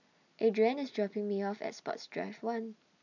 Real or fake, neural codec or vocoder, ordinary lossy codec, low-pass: real; none; none; 7.2 kHz